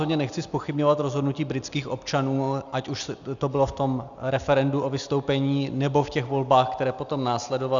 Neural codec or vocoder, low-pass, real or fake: none; 7.2 kHz; real